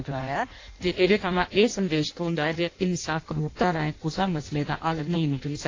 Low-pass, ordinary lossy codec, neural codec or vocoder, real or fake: 7.2 kHz; AAC, 32 kbps; codec, 16 kHz in and 24 kHz out, 0.6 kbps, FireRedTTS-2 codec; fake